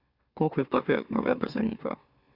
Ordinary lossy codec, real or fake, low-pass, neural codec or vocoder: Opus, 64 kbps; fake; 5.4 kHz; autoencoder, 44.1 kHz, a latent of 192 numbers a frame, MeloTTS